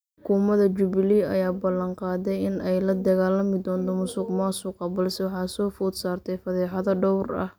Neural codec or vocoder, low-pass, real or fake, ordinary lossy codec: none; none; real; none